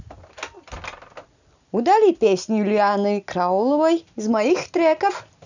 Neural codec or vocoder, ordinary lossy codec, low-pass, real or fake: none; none; 7.2 kHz; real